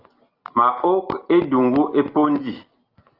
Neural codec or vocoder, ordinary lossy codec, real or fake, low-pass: none; Opus, 32 kbps; real; 5.4 kHz